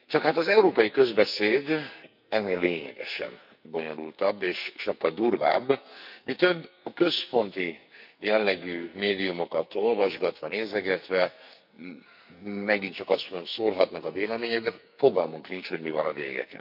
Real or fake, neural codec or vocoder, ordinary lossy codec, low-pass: fake; codec, 44.1 kHz, 2.6 kbps, SNAC; none; 5.4 kHz